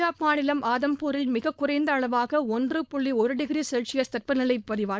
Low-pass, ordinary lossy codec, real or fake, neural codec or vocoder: none; none; fake; codec, 16 kHz, 4.8 kbps, FACodec